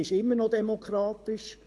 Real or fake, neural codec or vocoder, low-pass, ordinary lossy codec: fake; codec, 24 kHz, 6 kbps, HILCodec; none; none